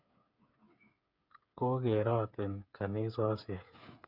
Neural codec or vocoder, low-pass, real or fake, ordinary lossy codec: codec, 16 kHz, 8 kbps, FreqCodec, smaller model; 5.4 kHz; fake; none